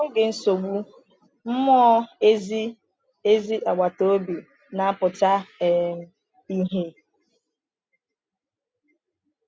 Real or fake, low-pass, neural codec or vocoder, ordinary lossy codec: real; none; none; none